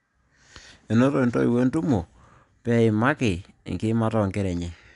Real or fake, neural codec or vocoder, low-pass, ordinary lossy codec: real; none; 10.8 kHz; MP3, 96 kbps